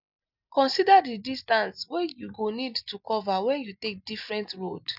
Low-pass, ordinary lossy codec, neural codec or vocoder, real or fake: 5.4 kHz; none; none; real